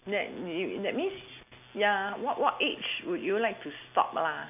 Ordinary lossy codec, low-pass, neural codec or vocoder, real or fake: none; 3.6 kHz; none; real